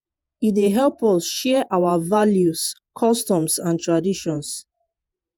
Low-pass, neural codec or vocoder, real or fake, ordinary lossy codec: none; vocoder, 48 kHz, 128 mel bands, Vocos; fake; none